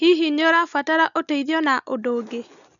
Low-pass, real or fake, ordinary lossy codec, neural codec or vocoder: 7.2 kHz; real; none; none